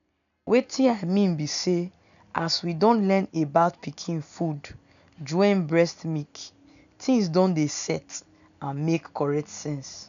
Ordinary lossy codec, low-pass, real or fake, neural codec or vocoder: none; 7.2 kHz; real; none